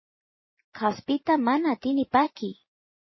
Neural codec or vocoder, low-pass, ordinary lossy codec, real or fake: vocoder, 44.1 kHz, 128 mel bands every 512 samples, BigVGAN v2; 7.2 kHz; MP3, 24 kbps; fake